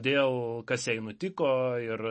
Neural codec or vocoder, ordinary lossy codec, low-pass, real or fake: none; MP3, 32 kbps; 10.8 kHz; real